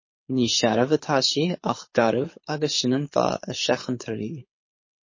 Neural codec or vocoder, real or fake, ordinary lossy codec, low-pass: codec, 24 kHz, 6 kbps, HILCodec; fake; MP3, 32 kbps; 7.2 kHz